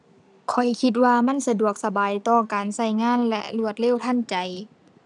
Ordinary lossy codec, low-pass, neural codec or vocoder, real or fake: none; 10.8 kHz; none; real